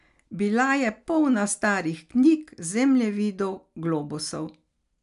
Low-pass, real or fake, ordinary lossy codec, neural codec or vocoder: 10.8 kHz; real; none; none